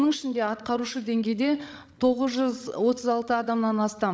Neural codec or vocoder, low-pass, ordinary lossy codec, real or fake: codec, 16 kHz, 16 kbps, FreqCodec, larger model; none; none; fake